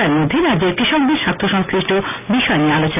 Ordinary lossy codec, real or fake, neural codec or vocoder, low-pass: none; real; none; 3.6 kHz